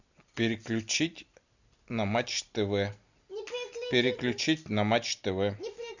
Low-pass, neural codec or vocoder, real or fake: 7.2 kHz; none; real